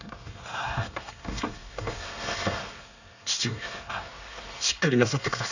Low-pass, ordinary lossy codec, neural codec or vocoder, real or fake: 7.2 kHz; none; codec, 24 kHz, 1 kbps, SNAC; fake